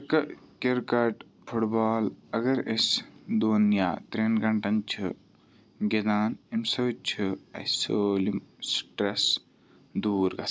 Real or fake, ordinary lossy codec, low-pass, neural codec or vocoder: real; none; none; none